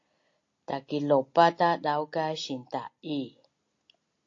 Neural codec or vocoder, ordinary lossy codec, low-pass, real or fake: none; AAC, 48 kbps; 7.2 kHz; real